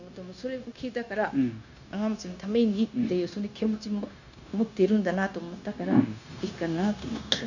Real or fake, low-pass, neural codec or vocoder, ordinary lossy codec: fake; 7.2 kHz; codec, 16 kHz, 0.9 kbps, LongCat-Audio-Codec; none